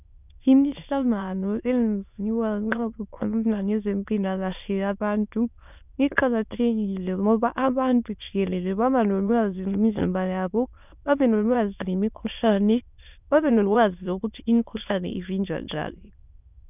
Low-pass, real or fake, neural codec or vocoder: 3.6 kHz; fake; autoencoder, 22.05 kHz, a latent of 192 numbers a frame, VITS, trained on many speakers